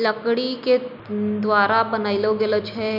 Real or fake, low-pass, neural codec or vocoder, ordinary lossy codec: real; 5.4 kHz; none; none